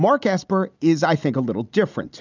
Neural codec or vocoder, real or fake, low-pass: none; real; 7.2 kHz